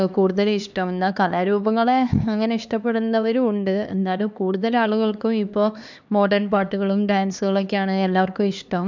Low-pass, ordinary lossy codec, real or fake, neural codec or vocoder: 7.2 kHz; none; fake; codec, 16 kHz, 2 kbps, X-Codec, HuBERT features, trained on LibriSpeech